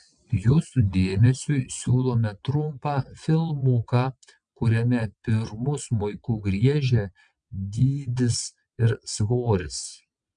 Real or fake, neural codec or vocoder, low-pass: fake; vocoder, 22.05 kHz, 80 mel bands, WaveNeXt; 9.9 kHz